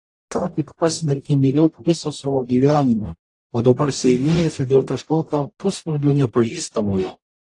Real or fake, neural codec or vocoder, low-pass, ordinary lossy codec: fake; codec, 44.1 kHz, 0.9 kbps, DAC; 10.8 kHz; AAC, 48 kbps